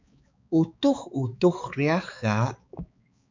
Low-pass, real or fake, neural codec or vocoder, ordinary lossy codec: 7.2 kHz; fake; codec, 16 kHz, 4 kbps, X-Codec, HuBERT features, trained on balanced general audio; MP3, 64 kbps